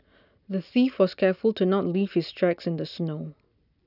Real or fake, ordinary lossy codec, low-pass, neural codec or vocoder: real; none; 5.4 kHz; none